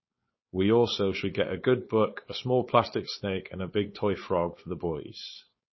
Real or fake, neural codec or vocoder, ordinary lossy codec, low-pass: fake; codec, 16 kHz, 4.8 kbps, FACodec; MP3, 24 kbps; 7.2 kHz